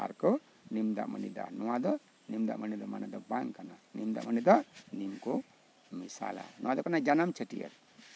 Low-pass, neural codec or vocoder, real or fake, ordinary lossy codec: none; none; real; none